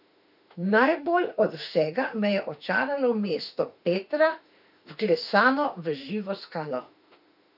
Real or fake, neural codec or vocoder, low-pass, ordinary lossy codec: fake; autoencoder, 48 kHz, 32 numbers a frame, DAC-VAE, trained on Japanese speech; 5.4 kHz; none